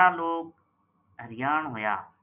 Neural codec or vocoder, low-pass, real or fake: none; 3.6 kHz; real